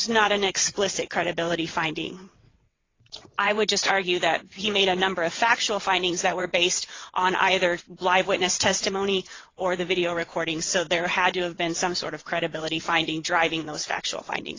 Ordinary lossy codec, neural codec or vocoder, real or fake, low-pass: AAC, 32 kbps; vocoder, 22.05 kHz, 80 mel bands, WaveNeXt; fake; 7.2 kHz